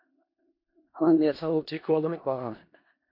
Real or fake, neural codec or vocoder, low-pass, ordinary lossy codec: fake; codec, 16 kHz in and 24 kHz out, 0.4 kbps, LongCat-Audio-Codec, four codebook decoder; 5.4 kHz; MP3, 32 kbps